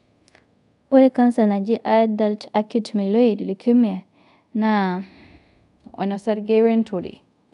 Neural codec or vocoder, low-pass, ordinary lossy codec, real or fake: codec, 24 kHz, 0.5 kbps, DualCodec; 10.8 kHz; none; fake